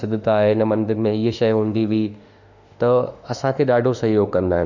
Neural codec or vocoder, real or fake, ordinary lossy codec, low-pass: autoencoder, 48 kHz, 32 numbers a frame, DAC-VAE, trained on Japanese speech; fake; none; 7.2 kHz